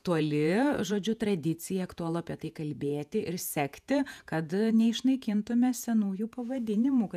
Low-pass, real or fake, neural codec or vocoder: 14.4 kHz; fake; vocoder, 48 kHz, 128 mel bands, Vocos